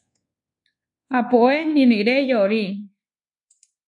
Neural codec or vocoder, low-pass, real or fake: codec, 24 kHz, 1.2 kbps, DualCodec; 10.8 kHz; fake